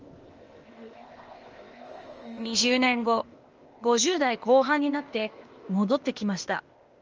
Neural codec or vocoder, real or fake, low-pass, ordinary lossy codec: codec, 16 kHz, 0.8 kbps, ZipCodec; fake; 7.2 kHz; Opus, 24 kbps